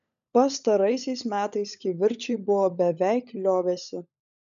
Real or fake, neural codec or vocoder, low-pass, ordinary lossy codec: fake; codec, 16 kHz, 16 kbps, FunCodec, trained on LibriTTS, 50 frames a second; 7.2 kHz; MP3, 96 kbps